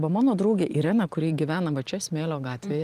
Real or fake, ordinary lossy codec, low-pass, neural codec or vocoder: fake; Opus, 24 kbps; 14.4 kHz; vocoder, 44.1 kHz, 128 mel bands every 512 samples, BigVGAN v2